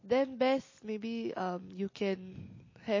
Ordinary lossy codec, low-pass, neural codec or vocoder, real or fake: MP3, 32 kbps; 7.2 kHz; none; real